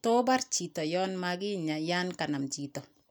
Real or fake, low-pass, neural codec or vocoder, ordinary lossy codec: real; none; none; none